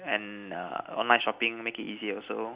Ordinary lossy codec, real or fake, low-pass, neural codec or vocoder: Opus, 64 kbps; real; 3.6 kHz; none